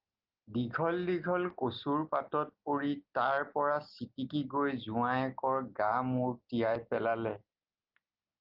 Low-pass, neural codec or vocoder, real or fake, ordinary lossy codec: 5.4 kHz; none; real; Opus, 32 kbps